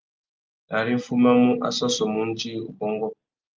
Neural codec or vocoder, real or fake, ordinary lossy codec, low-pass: none; real; Opus, 24 kbps; 7.2 kHz